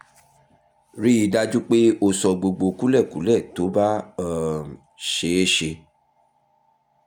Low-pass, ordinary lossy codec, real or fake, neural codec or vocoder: 19.8 kHz; none; real; none